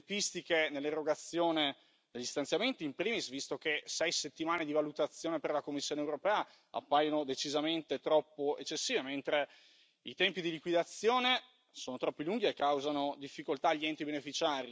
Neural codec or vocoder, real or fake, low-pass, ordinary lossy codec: none; real; none; none